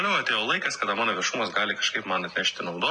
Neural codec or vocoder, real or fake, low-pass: none; real; 10.8 kHz